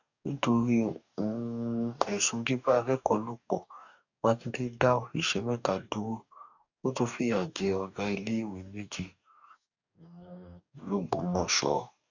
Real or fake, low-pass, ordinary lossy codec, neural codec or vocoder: fake; 7.2 kHz; none; codec, 44.1 kHz, 2.6 kbps, DAC